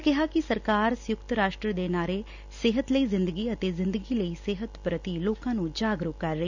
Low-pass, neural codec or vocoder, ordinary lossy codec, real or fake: 7.2 kHz; none; none; real